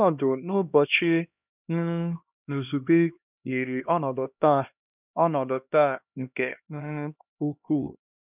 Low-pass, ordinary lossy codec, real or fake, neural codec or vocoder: 3.6 kHz; none; fake; codec, 16 kHz, 1 kbps, X-Codec, HuBERT features, trained on LibriSpeech